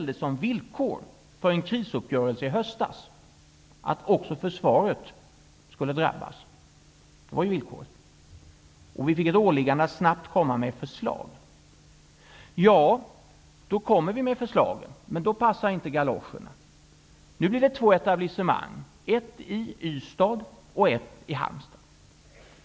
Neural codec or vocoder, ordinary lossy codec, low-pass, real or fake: none; none; none; real